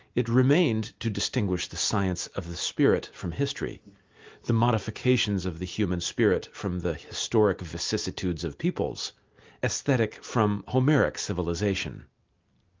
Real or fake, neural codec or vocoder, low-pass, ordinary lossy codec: real; none; 7.2 kHz; Opus, 32 kbps